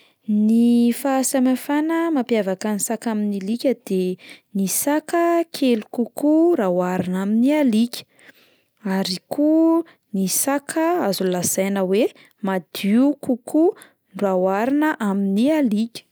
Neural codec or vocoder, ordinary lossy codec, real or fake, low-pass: none; none; real; none